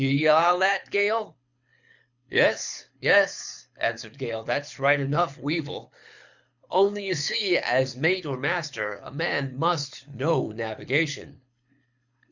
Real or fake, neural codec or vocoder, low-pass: fake; codec, 24 kHz, 6 kbps, HILCodec; 7.2 kHz